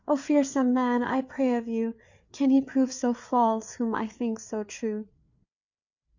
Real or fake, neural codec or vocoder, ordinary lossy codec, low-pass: fake; codec, 16 kHz, 4 kbps, FreqCodec, larger model; Opus, 64 kbps; 7.2 kHz